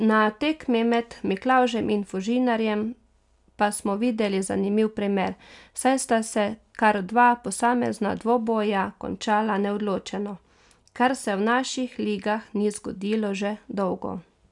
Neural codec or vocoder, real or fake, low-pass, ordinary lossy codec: none; real; 10.8 kHz; none